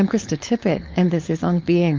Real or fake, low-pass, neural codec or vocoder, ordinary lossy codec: fake; 7.2 kHz; codec, 16 kHz, 4.8 kbps, FACodec; Opus, 32 kbps